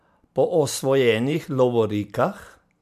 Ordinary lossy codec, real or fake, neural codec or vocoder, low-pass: MP3, 96 kbps; fake; vocoder, 44.1 kHz, 128 mel bands every 512 samples, BigVGAN v2; 14.4 kHz